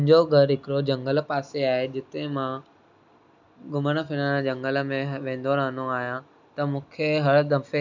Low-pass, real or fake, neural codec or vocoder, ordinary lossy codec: 7.2 kHz; real; none; none